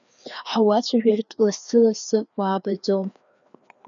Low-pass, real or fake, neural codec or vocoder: 7.2 kHz; fake; codec, 16 kHz, 2 kbps, FreqCodec, larger model